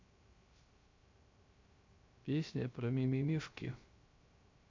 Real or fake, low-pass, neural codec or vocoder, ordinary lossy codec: fake; 7.2 kHz; codec, 16 kHz, 0.3 kbps, FocalCodec; MP3, 48 kbps